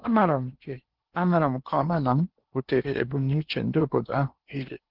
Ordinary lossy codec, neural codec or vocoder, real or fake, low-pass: Opus, 16 kbps; codec, 16 kHz in and 24 kHz out, 0.8 kbps, FocalCodec, streaming, 65536 codes; fake; 5.4 kHz